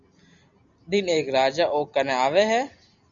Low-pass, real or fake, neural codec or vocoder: 7.2 kHz; real; none